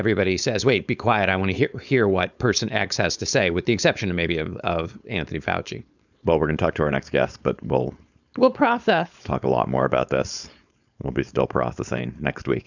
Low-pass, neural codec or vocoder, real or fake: 7.2 kHz; codec, 16 kHz, 4.8 kbps, FACodec; fake